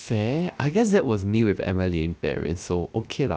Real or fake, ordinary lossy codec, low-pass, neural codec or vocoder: fake; none; none; codec, 16 kHz, about 1 kbps, DyCAST, with the encoder's durations